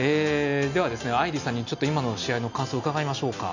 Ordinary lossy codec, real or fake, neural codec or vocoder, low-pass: none; real; none; 7.2 kHz